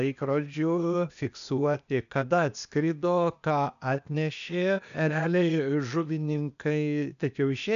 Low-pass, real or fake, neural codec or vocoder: 7.2 kHz; fake; codec, 16 kHz, 0.8 kbps, ZipCodec